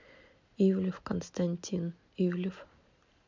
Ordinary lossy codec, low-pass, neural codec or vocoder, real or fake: MP3, 64 kbps; 7.2 kHz; none; real